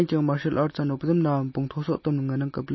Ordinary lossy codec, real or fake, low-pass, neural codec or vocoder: MP3, 24 kbps; real; 7.2 kHz; none